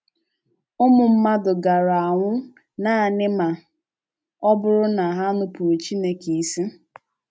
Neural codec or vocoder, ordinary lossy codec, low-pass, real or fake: none; none; none; real